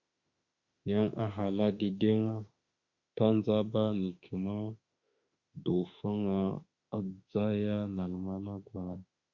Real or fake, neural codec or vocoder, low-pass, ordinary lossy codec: fake; autoencoder, 48 kHz, 32 numbers a frame, DAC-VAE, trained on Japanese speech; 7.2 kHz; Opus, 64 kbps